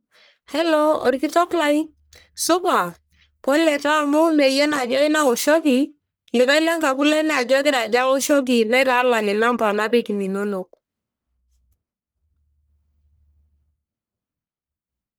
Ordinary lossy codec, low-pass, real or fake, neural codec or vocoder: none; none; fake; codec, 44.1 kHz, 1.7 kbps, Pupu-Codec